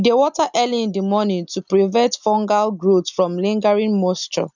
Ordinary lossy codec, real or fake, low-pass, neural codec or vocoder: none; real; 7.2 kHz; none